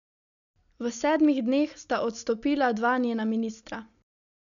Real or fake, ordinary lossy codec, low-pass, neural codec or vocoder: real; none; 7.2 kHz; none